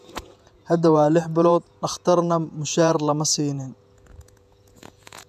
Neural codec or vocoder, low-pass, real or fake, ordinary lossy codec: vocoder, 48 kHz, 128 mel bands, Vocos; 14.4 kHz; fake; none